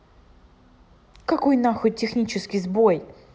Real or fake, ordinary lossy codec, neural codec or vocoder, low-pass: real; none; none; none